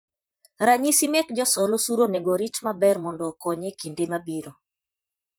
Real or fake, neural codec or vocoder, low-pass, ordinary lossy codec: fake; vocoder, 44.1 kHz, 128 mel bands, Pupu-Vocoder; none; none